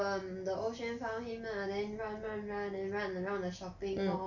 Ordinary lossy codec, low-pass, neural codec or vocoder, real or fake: none; 7.2 kHz; none; real